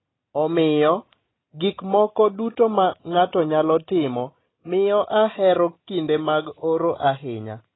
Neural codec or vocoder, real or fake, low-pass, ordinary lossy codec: none; real; 7.2 kHz; AAC, 16 kbps